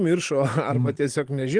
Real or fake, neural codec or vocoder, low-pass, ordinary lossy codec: real; none; 9.9 kHz; Opus, 24 kbps